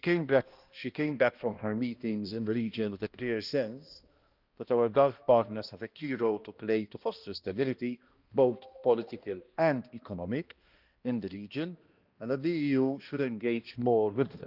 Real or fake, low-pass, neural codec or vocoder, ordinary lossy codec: fake; 5.4 kHz; codec, 16 kHz, 1 kbps, X-Codec, HuBERT features, trained on balanced general audio; Opus, 32 kbps